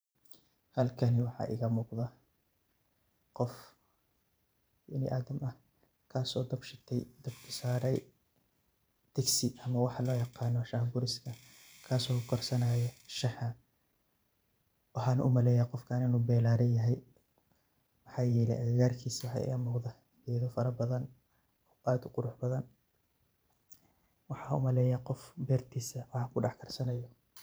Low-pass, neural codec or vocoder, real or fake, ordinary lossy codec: none; vocoder, 44.1 kHz, 128 mel bands every 512 samples, BigVGAN v2; fake; none